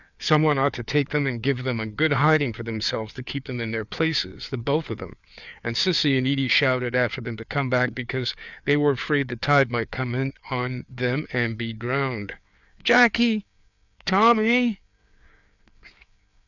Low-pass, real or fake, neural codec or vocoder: 7.2 kHz; fake; codec, 16 kHz, 4 kbps, FreqCodec, larger model